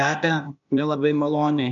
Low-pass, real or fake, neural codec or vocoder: 7.2 kHz; fake; codec, 16 kHz, 0.8 kbps, ZipCodec